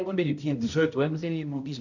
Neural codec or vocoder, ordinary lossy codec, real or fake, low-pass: codec, 16 kHz, 0.5 kbps, X-Codec, HuBERT features, trained on balanced general audio; none; fake; 7.2 kHz